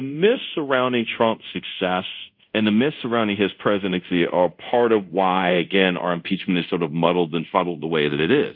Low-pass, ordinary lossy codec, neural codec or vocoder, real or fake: 5.4 kHz; AAC, 48 kbps; codec, 24 kHz, 0.5 kbps, DualCodec; fake